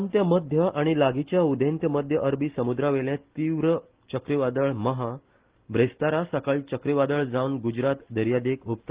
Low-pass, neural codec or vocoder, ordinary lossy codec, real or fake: 3.6 kHz; none; Opus, 16 kbps; real